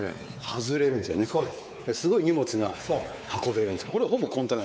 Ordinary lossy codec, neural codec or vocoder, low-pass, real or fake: none; codec, 16 kHz, 4 kbps, X-Codec, WavLM features, trained on Multilingual LibriSpeech; none; fake